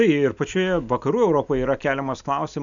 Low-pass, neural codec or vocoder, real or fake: 7.2 kHz; none; real